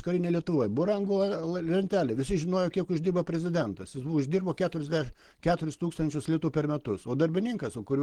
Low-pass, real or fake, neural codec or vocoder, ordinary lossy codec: 19.8 kHz; real; none; Opus, 16 kbps